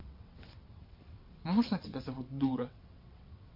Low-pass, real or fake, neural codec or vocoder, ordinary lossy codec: 5.4 kHz; fake; vocoder, 22.05 kHz, 80 mel bands, WaveNeXt; MP3, 32 kbps